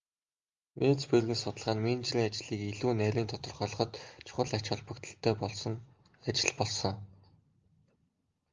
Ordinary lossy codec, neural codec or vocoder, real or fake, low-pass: Opus, 32 kbps; none; real; 7.2 kHz